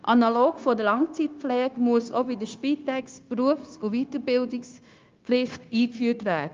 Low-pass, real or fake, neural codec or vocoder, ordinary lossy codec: 7.2 kHz; fake; codec, 16 kHz, 0.9 kbps, LongCat-Audio-Codec; Opus, 32 kbps